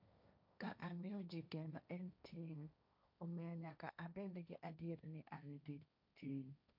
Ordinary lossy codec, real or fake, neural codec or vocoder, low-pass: none; fake; codec, 16 kHz, 1.1 kbps, Voila-Tokenizer; 5.4 kHz